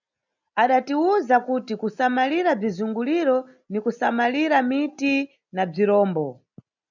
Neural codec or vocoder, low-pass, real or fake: none; 7.2 kHz; real